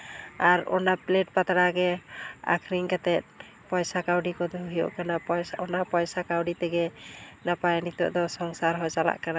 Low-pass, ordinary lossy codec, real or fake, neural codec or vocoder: none; none; real; none